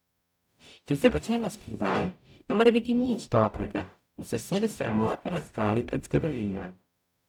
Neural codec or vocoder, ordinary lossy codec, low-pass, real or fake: codec, 44.1 kHz, 0.9 kbps, DAC; none; 19.8 kHz; fake